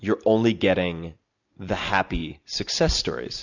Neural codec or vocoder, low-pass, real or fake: none; 7.2 kHz; real